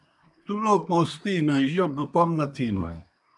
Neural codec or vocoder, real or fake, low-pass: codec, 24 kHz, 1 kbps, SNAC; fake; 10.8 kHz